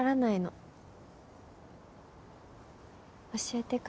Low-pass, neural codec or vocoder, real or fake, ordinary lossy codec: none; none; real; none